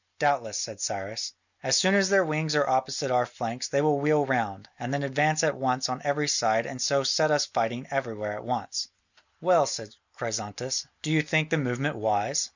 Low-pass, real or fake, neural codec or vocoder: 7.2 kHz; real; none